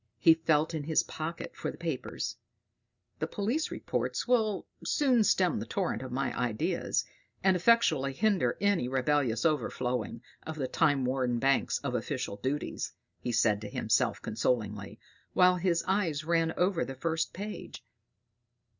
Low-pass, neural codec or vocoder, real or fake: 7.2 kHz; none; real